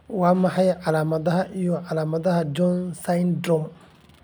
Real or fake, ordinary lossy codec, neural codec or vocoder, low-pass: real; none; none; none